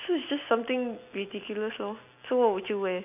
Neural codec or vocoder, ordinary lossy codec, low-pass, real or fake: none; none; 3.6 kHz; real